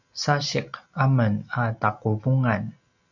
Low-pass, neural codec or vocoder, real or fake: 7.2 kHz; none; real